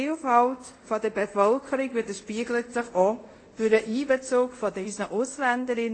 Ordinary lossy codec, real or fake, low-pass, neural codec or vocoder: AAC, 32 kbps; fake; 9.9 kHz; codec, 24 kHz, 0.5 kbps, DualCodec